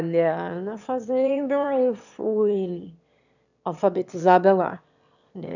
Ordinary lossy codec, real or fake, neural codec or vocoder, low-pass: none; fake; autoencoder, 22.05 kHz, a latent of 192 numbers a frame, VITS, trained on one speaker; 7.2 kHz